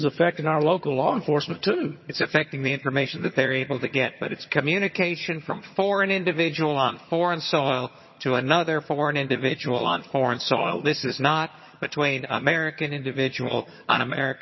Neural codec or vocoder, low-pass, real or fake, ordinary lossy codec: vocoder, 22.05 kHz, 80 mel bands, HiFi-GAN; 7.2 kHz; fake; MP3, 24 kbps